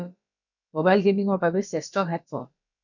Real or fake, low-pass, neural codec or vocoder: fake; 7.2 kHz; codec, 16 kHz, about 1 kbps, DyCAST, with the encoder's durations